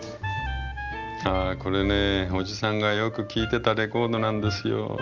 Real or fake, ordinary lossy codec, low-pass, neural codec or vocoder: real; Opus, 32 kbps; 7.2 kHz; none